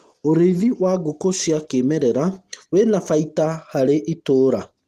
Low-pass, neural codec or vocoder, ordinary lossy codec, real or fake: 14.4 kHz; none; Opus, 24 kbps; real